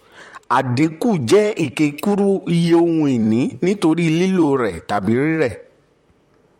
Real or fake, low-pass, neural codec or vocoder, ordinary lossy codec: fake; 19.8 kHz; vocoder, 44.1 kHz, 128 mel bands, Pupu-Vocoder; MP3, 64 kbps